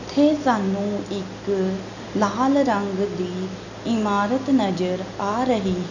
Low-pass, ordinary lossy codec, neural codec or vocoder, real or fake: 7.2 kHz; none; none; real